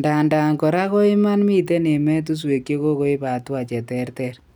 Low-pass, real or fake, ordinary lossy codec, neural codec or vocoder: none; real; none; none